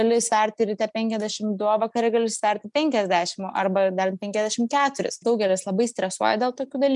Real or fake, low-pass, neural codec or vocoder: real; 10.8 kHz; none